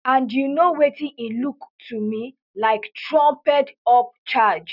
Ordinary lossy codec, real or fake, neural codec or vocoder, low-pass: none; real; none; 5.4 kHz